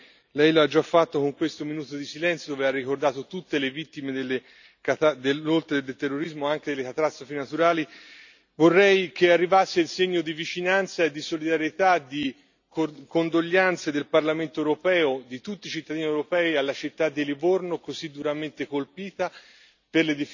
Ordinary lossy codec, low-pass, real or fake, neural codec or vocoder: none; 7.2 kHz; real; none